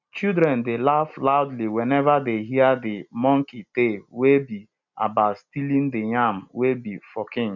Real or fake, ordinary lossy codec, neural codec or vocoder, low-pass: real; none; none; 7.2 kHz